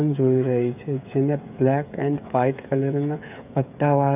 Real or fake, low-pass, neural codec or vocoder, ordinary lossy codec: fake; 3.6 kHz; codec, 16 kHz, 8 kbps, FreqCodec, smaller model; none